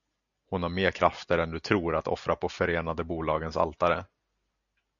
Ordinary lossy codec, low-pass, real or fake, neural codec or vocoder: MP3, 96 kbps; 7.2 kHz; real; none